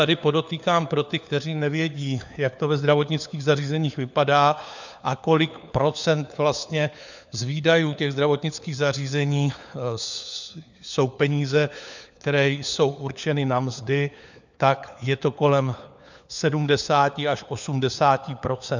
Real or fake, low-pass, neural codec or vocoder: fake; 7.2 kHz; codec, 16 kHz, 4 kbps, FunCodec, trained on LibriTTS, 50 frames a second